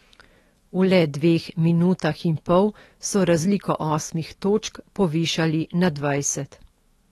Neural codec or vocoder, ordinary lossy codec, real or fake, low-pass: autoencoder, 48 kHz, 128 numbers a frame, DAC-VAE, trained on Japanese speech; AAC, 32 kbps; fake; 19.8 kHz